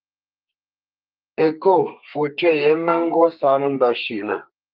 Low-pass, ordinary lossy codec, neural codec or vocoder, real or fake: 5.4 kHz; Opus, 32 kbps; codec, 32 kHz, 1.9 kbps, SNAC; fake